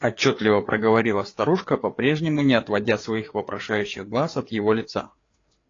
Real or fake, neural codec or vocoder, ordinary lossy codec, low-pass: fake; codec, 16 kHz, 4 kbps, FreqCodec, larger model; AAC, 32 kbps; 7.2 kHz